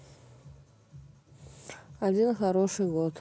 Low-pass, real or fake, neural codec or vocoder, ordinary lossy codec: none; real; none; none